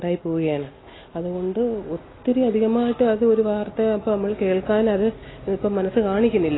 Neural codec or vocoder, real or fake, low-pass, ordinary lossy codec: none; real; 7.2 kHz; AAC, 16 kbps